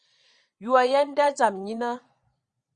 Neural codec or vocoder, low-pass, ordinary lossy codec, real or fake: vocoder, 22.05 kHz, 80 mel bands, Vocos; 9.9 kHz; Opus, 64 kbps; fake